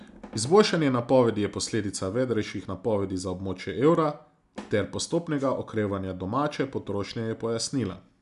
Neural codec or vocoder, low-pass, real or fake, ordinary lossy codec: none; 10.8 kHz; real; none